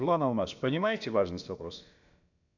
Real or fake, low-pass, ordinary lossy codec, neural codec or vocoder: fake; 7.2 kHz; none; codec, 16 kHz, about 1 kbps, DyCAST, with the encoder's durations